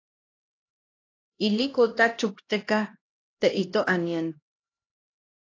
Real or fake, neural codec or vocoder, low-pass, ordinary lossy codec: fake; codec, 16 kHz, 1 kbps, X-Codec, HuBERT features, trained on LibriSpeech; 7.2 kHz; AAC, 32 kbps